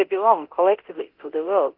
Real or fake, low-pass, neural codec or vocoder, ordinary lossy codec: fake; 5.4 kHz; codec, 24 kHz, 0.9 kbps, DualCodec; Opus, 24 kbps